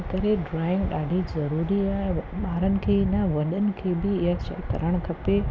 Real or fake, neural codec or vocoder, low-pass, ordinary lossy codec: real; none; none; none